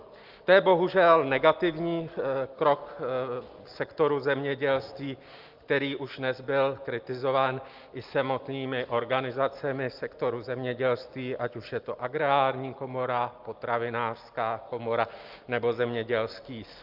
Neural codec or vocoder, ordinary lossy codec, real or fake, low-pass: none; Opus, 24 kbps; real; 5.4 kHz